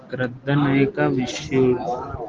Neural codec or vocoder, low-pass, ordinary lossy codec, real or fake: none; 7.2 kHz; Opus, 16 kbps; real